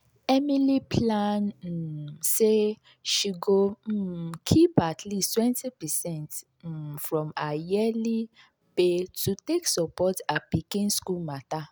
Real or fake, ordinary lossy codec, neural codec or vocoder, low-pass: real; none; none; none